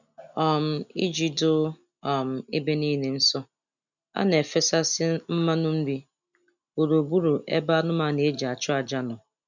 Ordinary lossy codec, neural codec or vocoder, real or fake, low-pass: none; none; real; 7.2 kHz